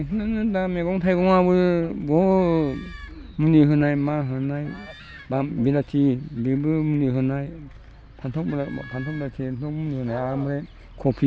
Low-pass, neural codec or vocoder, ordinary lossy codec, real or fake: none; none; none; real